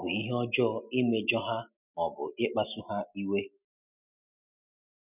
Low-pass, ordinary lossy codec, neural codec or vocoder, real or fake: 3.6 kHz; none; none; real